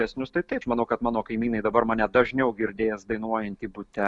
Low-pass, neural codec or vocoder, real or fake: 10.8 kHz; none; real